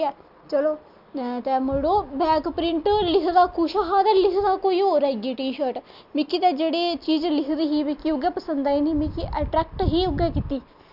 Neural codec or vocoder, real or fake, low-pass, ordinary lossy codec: none; real; 5.4 kHz; none